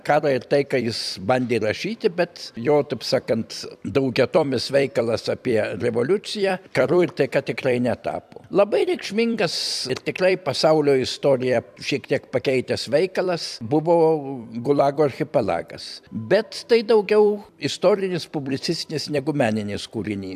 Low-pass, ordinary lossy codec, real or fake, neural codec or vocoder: 14.4 kHz; AAC, 96 kbps; fake; vocoder, 44.1 kHz, 128 mel bands every 256 samples, BigVGAN v2